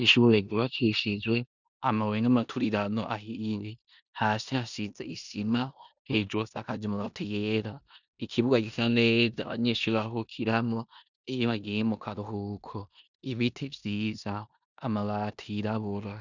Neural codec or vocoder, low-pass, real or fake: codec, 16 kHz in and 24 kHz out, 0.9 kbps, LongCat-Audio-Codec, four codebook decoder; 7.2 kHz; fake